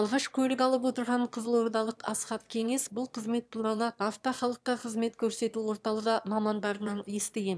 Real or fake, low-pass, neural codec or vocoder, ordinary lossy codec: fake; none; autoencoder, 22.05 kHz, a latent of 192 numbers a frame, VITS, trained on one speaker; none